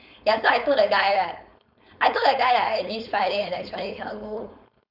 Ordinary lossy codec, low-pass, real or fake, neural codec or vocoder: none; 5.4 kHz; fake; codec, 16 kHz, 4.8 kbps, FACodec